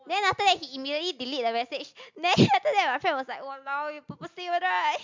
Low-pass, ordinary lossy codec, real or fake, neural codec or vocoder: 7.2 kHz; MP3, 64 kbps; real; none